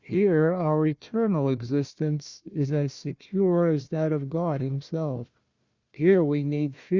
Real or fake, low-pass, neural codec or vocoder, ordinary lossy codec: fake; 7.2 kHz; codec, 16 kHz, 1 kbps, FunCodec, trained on Chinese and English, 50 frames a second; Opus, 64 kbps